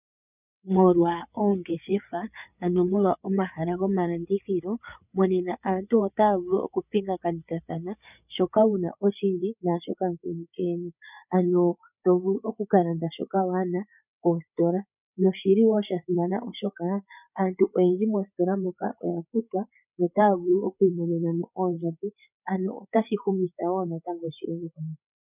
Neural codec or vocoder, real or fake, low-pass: codec, 16 kHz, 8 kbps, FreqCodec, larger model; fake; 3.6 kHz